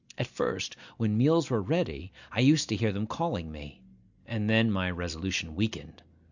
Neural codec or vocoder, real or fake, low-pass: none; real; 7.2 kHz